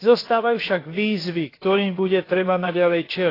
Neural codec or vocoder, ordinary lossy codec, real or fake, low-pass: codec, 16 kHz, about 1 kbps, DyCAST, with the encoder's durations; AAC, 24 kbps; fake; 5.4 kHz